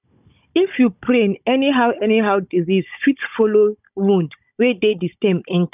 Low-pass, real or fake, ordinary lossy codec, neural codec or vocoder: 3.6 kHz; fake; none; codec, 16 kHz, 16 kbps, FunCodec, trained on Chinese and English, 50 frames a second